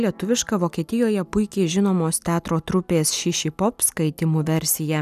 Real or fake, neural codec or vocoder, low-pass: real; none; 14.4 kHz